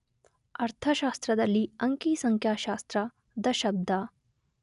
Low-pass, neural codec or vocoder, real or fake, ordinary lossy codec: 10.8 kHz; none; real; none